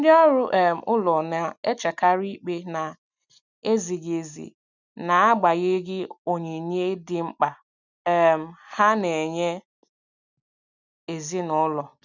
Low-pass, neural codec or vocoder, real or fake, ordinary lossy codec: 7.2 kHz; none; real; none